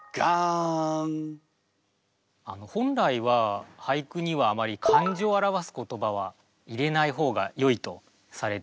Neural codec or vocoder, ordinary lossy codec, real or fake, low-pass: none; none; real; none